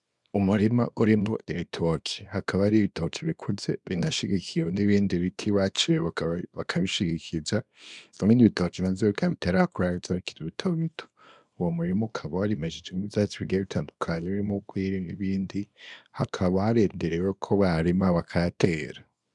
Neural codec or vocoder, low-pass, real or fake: codec, 24 kHz, 0.9 kbps, WavTokenizer, small release; 10.8 kHz; fake